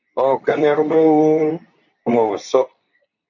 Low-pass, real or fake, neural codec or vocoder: 7.2 kHz; fake; codec, 24 kHz, 0.9 kbps, WavTokenizer, medium speech release version 2